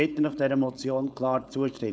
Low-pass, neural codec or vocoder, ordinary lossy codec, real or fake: none; codec, 16 kHz, 4.8 kbps, FACodec; none; fake